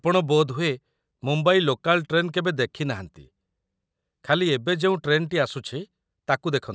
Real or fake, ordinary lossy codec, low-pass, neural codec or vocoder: real; none; none; none